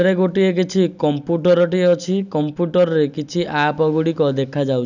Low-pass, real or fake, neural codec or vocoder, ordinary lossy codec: 7.2 kHz; real; none; none